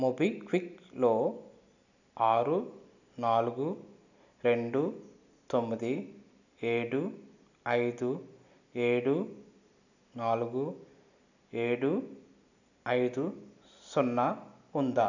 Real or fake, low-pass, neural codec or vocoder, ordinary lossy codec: real; 7.2 kHz; none; none